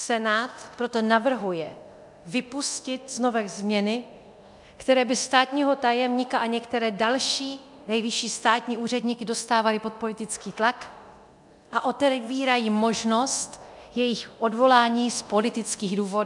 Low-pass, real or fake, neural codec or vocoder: 10.8 kHz; fake; codec, 24 kHz, 0.9 kbps, DualCodec